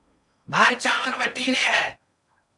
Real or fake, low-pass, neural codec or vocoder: fake; 10.8 kHz; codec, 16 kHz in and 24 kHz out, 0.6 kbps, FocalCodec, streaming, 4096 codes